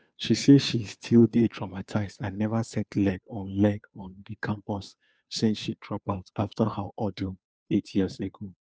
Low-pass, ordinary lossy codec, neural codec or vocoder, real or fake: none; none; codec, 16 kHz, 2 kbps, FunCodec, trained on Chinese and English, 25 frames a second; fake